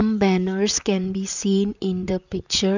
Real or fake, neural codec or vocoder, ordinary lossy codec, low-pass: fake; vocoder, 44.1 kHz, 128 mel bands, Pupu-Vocoder; none; 7.2 kHz